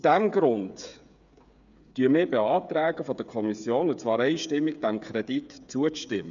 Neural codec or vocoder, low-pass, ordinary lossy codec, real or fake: codec, 16 kHz, 8 kbps, FreqCodec, smaller model; 7.2 kHz; none; fake